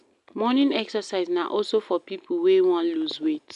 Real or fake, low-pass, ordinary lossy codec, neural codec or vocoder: real; 10.8 kHz; none; none